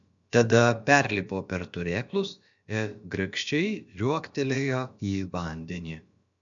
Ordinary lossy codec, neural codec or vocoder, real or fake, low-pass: MP3, 64 kbps; codec, 16 kHz, about 1 kbps, DyCAST, with the encoder's durations; fake; 7.2 kHz